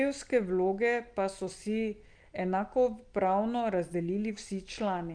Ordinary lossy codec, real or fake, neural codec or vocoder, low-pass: Opus, 32 kbps; real; none; 9.9 kHz